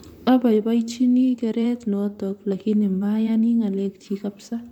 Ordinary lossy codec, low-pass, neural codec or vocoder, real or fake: none; 19.8 kHz; vocoder, 44.1 kHz, 128 mel bands, Pupu-Vocoder; fake